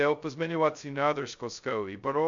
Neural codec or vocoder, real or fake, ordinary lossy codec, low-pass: codec, 16 kHz, 0.2 kbps, FocalCodec; fake; MP3, 64 kbps; 7.2 kHz